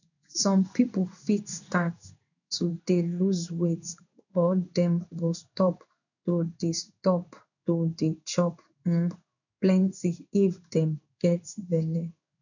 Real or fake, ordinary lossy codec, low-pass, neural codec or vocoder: fake; none; 7.2 kHz; codec, 16 kHz in and 24 kHz out, 1 kbps, XY-Tokenizer